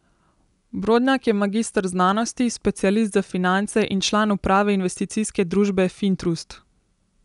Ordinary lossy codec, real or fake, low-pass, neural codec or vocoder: none; real; 10.8 kHz; none